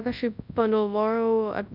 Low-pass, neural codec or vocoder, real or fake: 5.4 kHz; codec, 24 kHz, 0.9 kbps, WavTokenizer, large speech release; fake